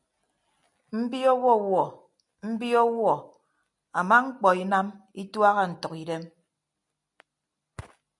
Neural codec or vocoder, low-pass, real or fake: none; 10.8 kHz; real